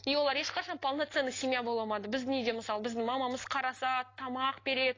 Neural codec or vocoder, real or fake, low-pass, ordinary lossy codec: none; real; 7.2 kHz; AAC, 32 kbps